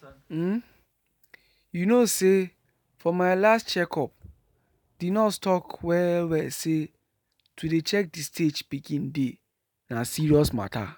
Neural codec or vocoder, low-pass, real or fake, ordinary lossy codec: none; none; real; none